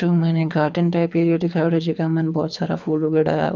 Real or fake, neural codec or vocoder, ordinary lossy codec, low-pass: fake; codec, 24 kHz, 6 kbps, HILCodec; none; 7.2 kHz